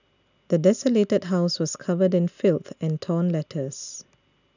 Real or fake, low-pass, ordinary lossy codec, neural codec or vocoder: real; 7.2 kHz; none; none